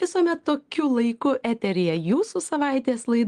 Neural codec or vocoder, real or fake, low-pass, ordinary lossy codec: none; real; 9.9 kHz; Opus, 24 kbps